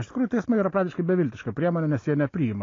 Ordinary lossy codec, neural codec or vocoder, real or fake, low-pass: AAC, 32 kbps; none; real; 7.2 kHz